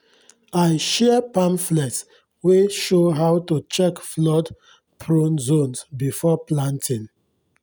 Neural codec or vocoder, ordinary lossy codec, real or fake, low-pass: none; none; real; none